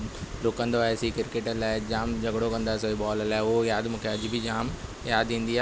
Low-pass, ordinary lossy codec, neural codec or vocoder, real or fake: none; none; none; real